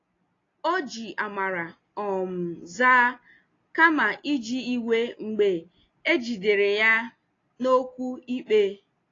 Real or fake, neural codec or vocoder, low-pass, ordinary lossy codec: real; none; 7.2 kHz; AAC, 32 kbps